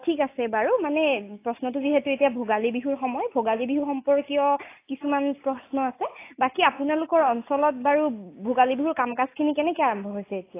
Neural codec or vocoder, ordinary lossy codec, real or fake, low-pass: none; AAC, 24 kbps; real; 3.6 kHz